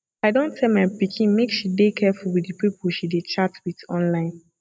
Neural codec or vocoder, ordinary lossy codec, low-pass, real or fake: none; none; none; real